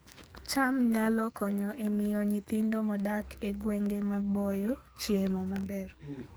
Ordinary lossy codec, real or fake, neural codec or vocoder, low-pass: none; fake; codec, 44.1 kHz, 2.6 kbps, SNAC; none